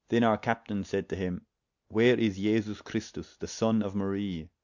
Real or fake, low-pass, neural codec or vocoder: real; 7.2 kHz; none